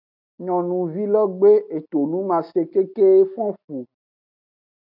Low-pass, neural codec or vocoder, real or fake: 5.4 kHz; none; real